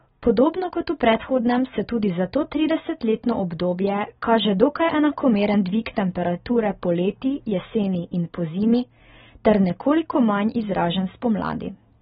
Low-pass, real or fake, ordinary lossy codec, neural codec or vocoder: 19.8 kHz; real; AAC, 16 kbps; none